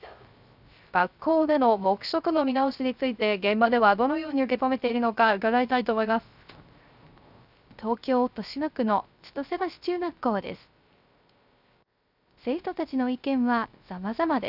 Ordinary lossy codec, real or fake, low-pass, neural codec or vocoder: none; fake; 5.4 kHz; codec, 16 kHz, 0.3 kbps, FocalCodec